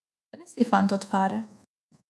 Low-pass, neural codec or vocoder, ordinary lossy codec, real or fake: none; codec, 24 kHz, 1.2 kbps, DualCodec; none; fake